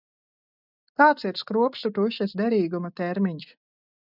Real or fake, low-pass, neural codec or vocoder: real; 5.4 kHz; none